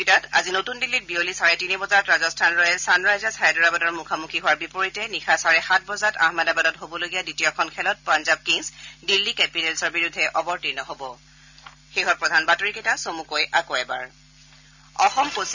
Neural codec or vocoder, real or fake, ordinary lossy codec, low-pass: none; real; none; 7.2 kHz